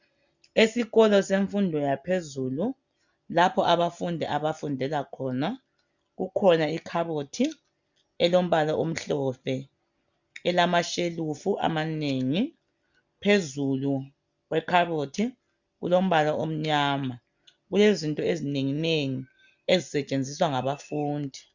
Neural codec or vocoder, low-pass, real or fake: none; 7.2 kHz; real